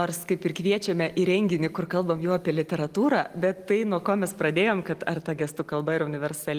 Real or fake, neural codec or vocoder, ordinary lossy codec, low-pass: real; none; Opus, 24 kbps; 14.4 kHz